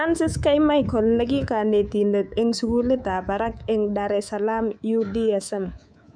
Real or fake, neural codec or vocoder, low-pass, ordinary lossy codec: fake; codec, 24 kHz, 3.1 kbps, DualCodec; 9.9 kHz; none